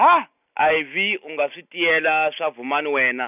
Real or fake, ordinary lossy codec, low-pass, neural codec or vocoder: real; none; 3.6 kHz; none